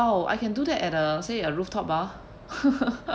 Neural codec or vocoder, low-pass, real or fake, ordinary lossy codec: none; none; real; none